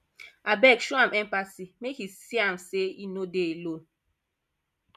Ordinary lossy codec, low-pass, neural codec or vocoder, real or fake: MP3, 96 kbps; 14.4 kHz; none; real